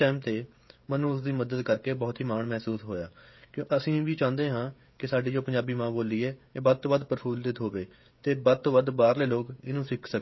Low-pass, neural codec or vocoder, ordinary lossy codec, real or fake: 7.2 kHz; codec, 16 kHz, 16 kbps, FreqCodec, smaller model; MP3, 24 kbps; fake